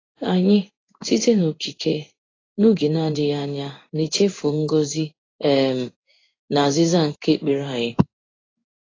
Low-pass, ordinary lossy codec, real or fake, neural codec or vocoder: 7.2 kHz; AAC, 32 kbps; fake; codec, 16 kHz in and 24 kHz out, 1 kbps, XY-Tokenizer